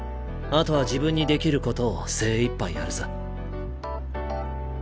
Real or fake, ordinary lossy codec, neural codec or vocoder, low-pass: real; none; none; none